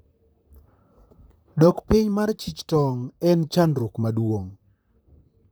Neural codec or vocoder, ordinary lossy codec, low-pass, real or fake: vocoder, 44.1 kHz, 128 mel bands, Pupu-Vocoder; none; none; fake